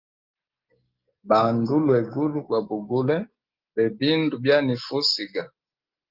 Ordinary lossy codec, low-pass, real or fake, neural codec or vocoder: Opus, 32 kbps; 5.4 kHz; real; none